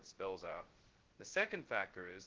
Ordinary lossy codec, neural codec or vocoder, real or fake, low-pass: Opus, 16 kbps; codec, 16 kHz, 0.2 kbps, FocalCodec; fake; 7.2 kHz